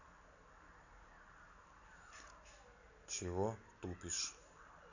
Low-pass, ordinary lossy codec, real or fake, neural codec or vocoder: 7.2 kHz; none; real; none